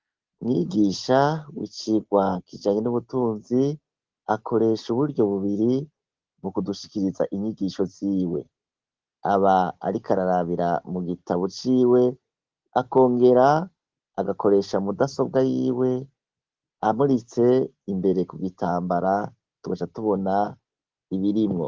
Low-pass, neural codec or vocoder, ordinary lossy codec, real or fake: 7.2 kHz; none; Opus, 16 kbps; real